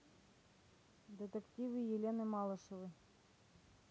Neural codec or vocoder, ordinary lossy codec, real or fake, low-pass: none; none; real; none